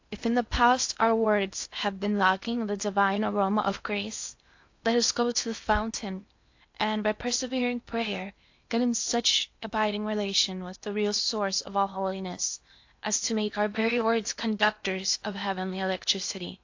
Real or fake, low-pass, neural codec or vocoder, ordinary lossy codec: fake; 7.2 kHz; codec, 16 kHz in and 24 kHz out, 0.8 kbps, FocalCodec, streaming, 65536 codes; AAC, 48 kbps